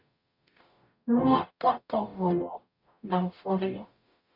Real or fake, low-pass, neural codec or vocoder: fake; 5.4 kHz; codec, 44.1 kHz, 0.9 kbps, DAC